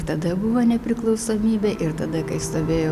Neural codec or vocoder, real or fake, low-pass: none; real; 14.4 kHz